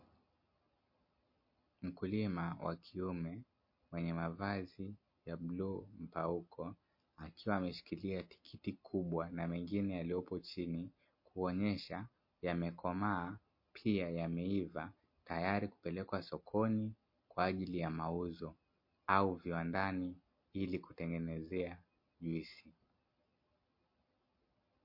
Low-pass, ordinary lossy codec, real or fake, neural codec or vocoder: 5.4 kHz; MP3, 32 kbps; real; none